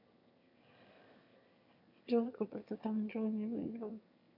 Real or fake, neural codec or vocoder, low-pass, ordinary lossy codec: fake; autoencoder, 22.05 kHz, a latent of 192 numbers a frame, VITS, trained on one speaker; 5.4 kHz; none